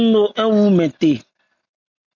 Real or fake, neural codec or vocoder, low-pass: real; none; 7.2 kHz